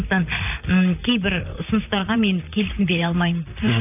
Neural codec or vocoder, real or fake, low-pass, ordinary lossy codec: vocoder, 44.1 kHz, 128 mel bands, Pupu-Vocoder; fake; 3.6 kHz; none